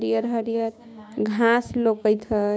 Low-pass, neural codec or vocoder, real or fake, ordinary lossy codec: none; codec, 16 kHz, 6 kbps, DAC; fake; none